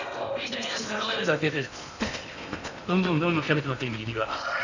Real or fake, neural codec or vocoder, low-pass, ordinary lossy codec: fake; codec, 16 kHz in and 24 kHz out, 0.8 kbps, FocalCodec, streaming, 65536 codes; 7.2 kHz; none